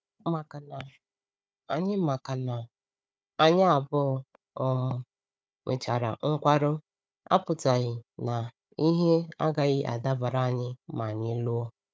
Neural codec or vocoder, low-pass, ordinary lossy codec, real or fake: codec, 16 kHz, 4 kbps, FunCodec, trained on Chinese and English, 50 frames a second; none; none; fake